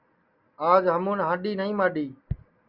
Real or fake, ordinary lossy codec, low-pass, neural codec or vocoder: real; Opus, 64 kbps; 5.4 kHz; none